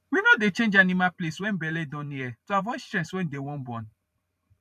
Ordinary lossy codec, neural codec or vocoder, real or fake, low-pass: none; vocoder, 44.1 kHz, 128 mel bands every 512 samples, BigVGAN v2; fake; 14.4 kHz